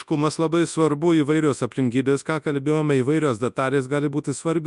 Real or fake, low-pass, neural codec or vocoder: fake; 10.8 kHz; codec, 24 kHz, 0.9 kbps, WavTokenizer, large speech release